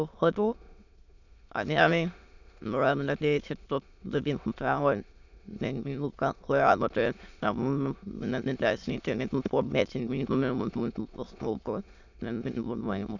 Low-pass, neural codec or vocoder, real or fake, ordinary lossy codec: 7.2 kHz; autoencoder, 22.05 kHz, a latent of 192 numbers a frame, VITS, trained on many speakers; fake; none